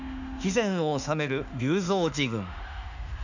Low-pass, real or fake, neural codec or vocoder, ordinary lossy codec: 7.2 kHz; fake; autoencoder, 48 kHz, 32 numbers a frame, DAC-VAE, trained on Japanese speech; none